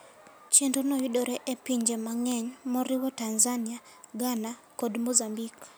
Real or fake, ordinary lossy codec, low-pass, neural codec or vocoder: real; none; none; none